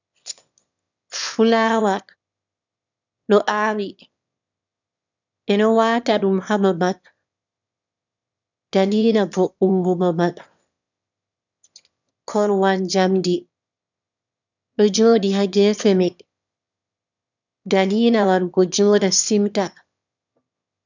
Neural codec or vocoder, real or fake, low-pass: autoencoder, 22.05 kHz, a latent of 192 numbers a frame, VITS, trained on one speaker; fake; 7.2 kHz